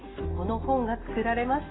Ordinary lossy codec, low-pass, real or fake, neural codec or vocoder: AAC, 16 kbps; 7.2 kHz; real; none